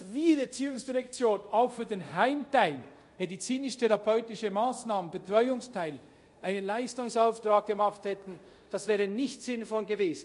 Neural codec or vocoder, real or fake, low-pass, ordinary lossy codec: codec, 24 kHz, 0.5 kbps, DualCodec; fake; 10.8 kHz; MP3, 48 kbps